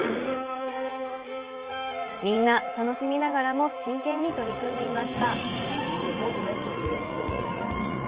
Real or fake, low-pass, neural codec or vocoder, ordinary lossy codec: fake; 3.6 kHz; vocoder, 44.1 kHz, 80 mel bands, Vocos; Opus, 32 kbps